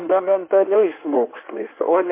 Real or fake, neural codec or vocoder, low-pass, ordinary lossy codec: fake; codec, 16 kHz in and 24 kHz out, 1.1 kbps, FireRedTTS-2 codec; 3.6 kHz; MP3, 24 kbps